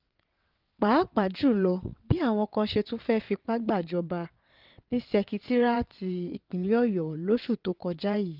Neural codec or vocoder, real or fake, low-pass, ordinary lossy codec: vocoder, 22.05 kHz, 80 mel bands, WaveNeXt; fake; 5.4 kHz; Opus, 32 kbps